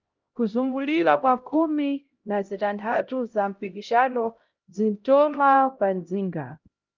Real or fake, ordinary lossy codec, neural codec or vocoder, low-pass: fake; Opus, 24 kbps; codec, 16 kHz, 0.5 kbps, X-Codec, HuBERT features, trained on LibriSpeech; 7.2 kHz